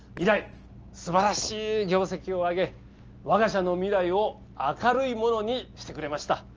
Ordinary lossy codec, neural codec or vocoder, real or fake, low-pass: Opus, 24 kbps; none; real; 7.2 kHz